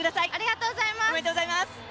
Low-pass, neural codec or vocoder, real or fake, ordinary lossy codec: none; none; real; none